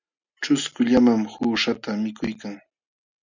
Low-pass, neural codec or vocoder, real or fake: 7.2 kHz; none; real